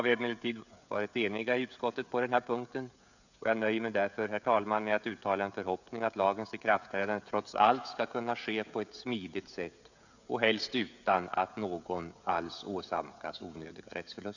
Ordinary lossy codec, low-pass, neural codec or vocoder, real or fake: none; 7.2 kHz; codec, 16 kHz, 16 kbps, FreqCodec, smaller model; fake